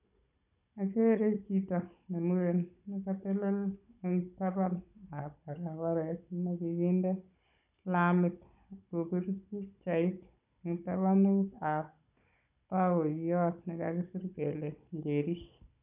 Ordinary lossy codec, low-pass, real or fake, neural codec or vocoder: none; 3.6 kHz; fake; codec, 16 kHz, 16 kbps, FunCodec, trained on Chinese and English, 50 frames a second